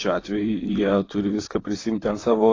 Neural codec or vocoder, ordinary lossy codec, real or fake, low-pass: vocoder, 44.1 kHz, 128 mel bands, Pupu-Vocoder; AAC, 32 kbps; fake; 7.2 kHz